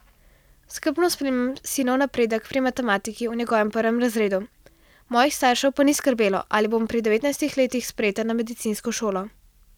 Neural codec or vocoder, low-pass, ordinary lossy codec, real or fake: none; 19.8 kHz; none; real